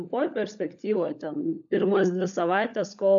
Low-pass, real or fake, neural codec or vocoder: 7.2 kHz; fake; codec, 16 kHz, 16 kbps, FunCodec, trained on LibriTTS, 50 frames a second